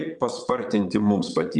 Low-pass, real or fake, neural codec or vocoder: 9.9 kHz; fake; vocoder, 22.05 kHz, 80 mel bands, Vocos